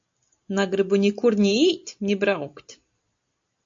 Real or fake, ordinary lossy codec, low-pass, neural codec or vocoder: real; AAC, 64 kbps; 7.2 kHz; none